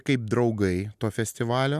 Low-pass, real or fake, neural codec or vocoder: 14.4 kHz; real; none